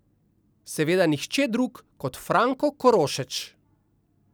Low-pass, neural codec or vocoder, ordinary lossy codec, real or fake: none; none; none; real